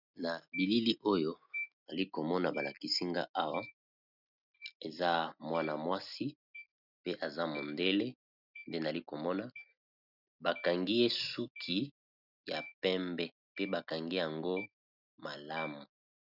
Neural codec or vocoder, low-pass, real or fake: none; 5.4 kHz; real